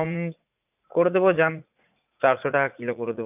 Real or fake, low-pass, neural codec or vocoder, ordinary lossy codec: fake; 3.6 kHz; vocoder, 44.1 kHz, 80 mel bands, Vocos; none